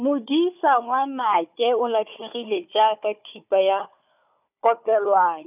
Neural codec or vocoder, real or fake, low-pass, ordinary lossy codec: codec, 16 kHz, 4 kbps, FunCodec, trained on Chinese and English, 50 frames a second; fake; 3.6 kHz; none